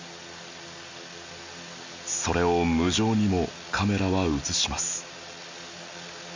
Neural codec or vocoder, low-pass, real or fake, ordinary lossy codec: none; 7.2 kHz; real; MP3, 64 kbps